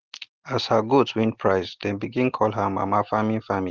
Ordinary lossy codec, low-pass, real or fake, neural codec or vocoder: Opus, 16 kbps; 7.2 kHz; real; none